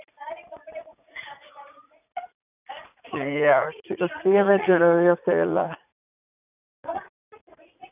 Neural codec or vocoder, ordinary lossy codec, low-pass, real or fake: vocoder, 44.1 kHz, 80 mel bands, Vocos; none; 3.6 kHz; fake